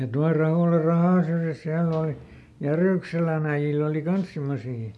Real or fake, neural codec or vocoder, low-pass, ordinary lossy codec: real; none; none; none